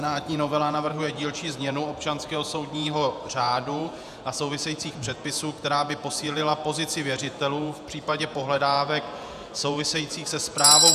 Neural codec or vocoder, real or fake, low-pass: vocoder, 48 kHz, 128 mel bands, Vocos; fake; 14.4 kHz